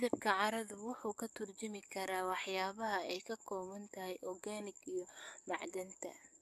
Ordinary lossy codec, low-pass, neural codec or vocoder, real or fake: AAC, 96 kbps; 14.4 kHz; codec, 44.1 kHz, 7.8 kbps, DAC; fake